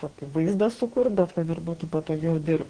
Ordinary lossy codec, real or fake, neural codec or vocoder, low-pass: Opus, 16 kbps; fake; codec, 44.1 kHz, 2.6 kbps, DAC; 9.9 kHz